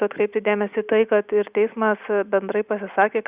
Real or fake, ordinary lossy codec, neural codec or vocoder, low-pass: real; Opus, 64 kbps; none; 3.6 kHz